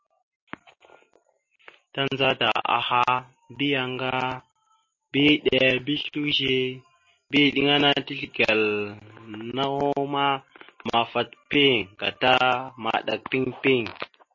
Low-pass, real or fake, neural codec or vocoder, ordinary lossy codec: 7.2 kHz; real; none; MP3, 32 kbps